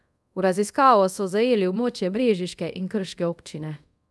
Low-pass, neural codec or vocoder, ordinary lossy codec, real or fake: none; codec, 24 kHz, 0.5 kbps, DualCodec; none; fake